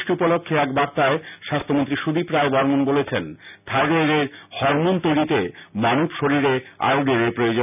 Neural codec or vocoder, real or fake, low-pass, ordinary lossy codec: none; real; 3.6 kHz; none